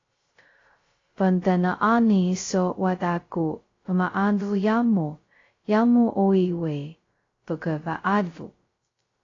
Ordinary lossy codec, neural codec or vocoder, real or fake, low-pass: AAC, 32 kbps; codec, 16 kHz, 0.2 kbps, FocalCodec; fake; 7.2 kHz